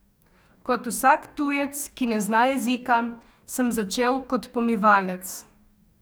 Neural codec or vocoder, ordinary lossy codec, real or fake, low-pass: codec, 44.1 kHz, 2.6 kbps, DAC; none; fake; none